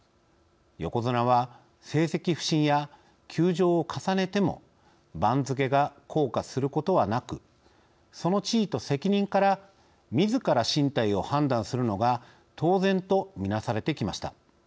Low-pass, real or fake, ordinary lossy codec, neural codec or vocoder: none; real; none; none